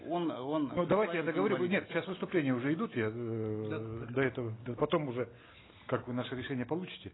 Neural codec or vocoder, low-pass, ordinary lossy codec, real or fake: none; 7.2 kHz; AAC, 16 kbps; real